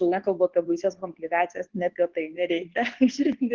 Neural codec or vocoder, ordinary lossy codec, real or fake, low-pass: codec, 24 kHz, 0.9 kbps, WavTokenizer, medium speech release version 2; Opus, 16 kbps; fake; 7.2 kHz